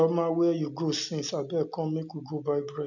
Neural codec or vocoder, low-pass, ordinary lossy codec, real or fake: none; 7.2 kHz; none; real